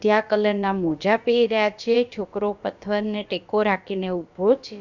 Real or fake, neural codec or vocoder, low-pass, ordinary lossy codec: fake; codec, 16 kHz, about 1 kbps, DyCAST, with the encoder's durations; 7.2 kHz; none